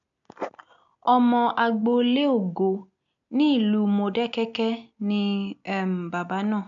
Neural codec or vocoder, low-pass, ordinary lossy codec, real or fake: none; 7.2 kHz; none; real